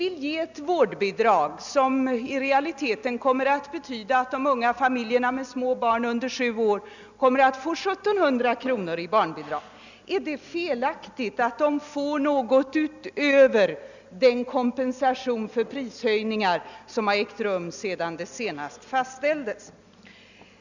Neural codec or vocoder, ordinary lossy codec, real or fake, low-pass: none; none; real; 7.2 kHz